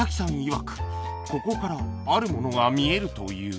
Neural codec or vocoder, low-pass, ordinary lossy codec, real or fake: none; none; none; real